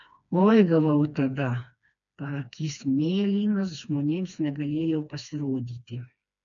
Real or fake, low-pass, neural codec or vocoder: fake; 7.2 kHz; codec, 16 kHz, 2 kbps, FreqCodec, smaller model